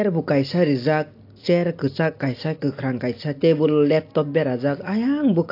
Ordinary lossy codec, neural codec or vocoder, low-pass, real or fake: AAC, 32 kbps; none; 5.4 kHz; real